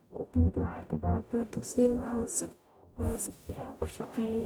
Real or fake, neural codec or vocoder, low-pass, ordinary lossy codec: fake; codec, 44.1 kHz, 0.9 kbps, DAC; none; none